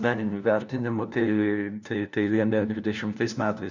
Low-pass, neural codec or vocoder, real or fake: 7.2 kHz; codec, 16 kHz, 1 kbps, FunCodec, trained on LibriTTS, 50 frames a second; fake